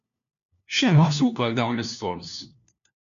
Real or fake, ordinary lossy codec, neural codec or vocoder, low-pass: fake; MP3, 96 kbps; codec, 16 kHz, 1 kbps, FunCodec, trained on LibriTTS, 50 frames a second; 7.2 kHz